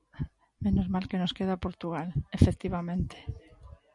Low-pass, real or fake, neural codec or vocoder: 10.8 kHz; real; none